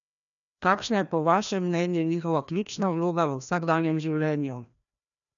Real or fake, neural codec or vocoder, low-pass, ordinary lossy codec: fake; codec, 16 kHz, 1 kbps, FreqCodec, larger model; 7.2 kHz; none